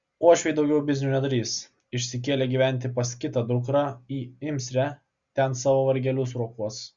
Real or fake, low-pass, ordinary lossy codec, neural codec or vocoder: real; 7.2 kHz; Opus, 64 kbps; none